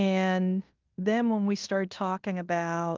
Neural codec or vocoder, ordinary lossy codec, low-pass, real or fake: codec, 16 kHz in and 24 kHz out, 0.9 kbps, LongCat-Audio-Codec, fine tuned four codebook decoder; Opus, 24 kbps; 7.2 kHz; fake